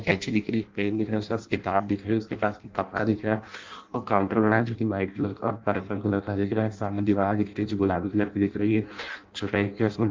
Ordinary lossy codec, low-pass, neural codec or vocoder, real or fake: Opus, 32 kbps; 7.2 kHz; codec, 16 kHz in and 24 kHz out, 0.6 kbps, FireRedTTS-2 codec; fake